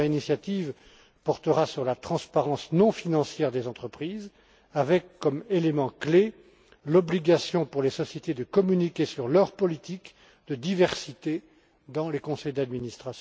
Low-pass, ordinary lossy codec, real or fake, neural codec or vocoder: none; none; real; none